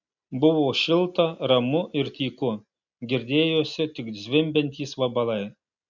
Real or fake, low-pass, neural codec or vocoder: real; 7.2 kHz; none